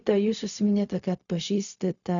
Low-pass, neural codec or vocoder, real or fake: 7.2 kHz; codec, 16 kHz, 0.4 kbps, LongCat-Audio-Codec; fake